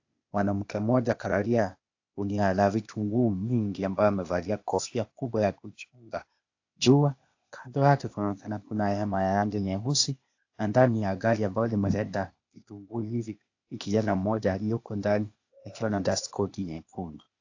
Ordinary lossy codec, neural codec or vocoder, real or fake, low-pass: AAC, 48 kbps; codec, 16 kHz, 0.8 kbps, ZipCodec; fake; 7.2 kHz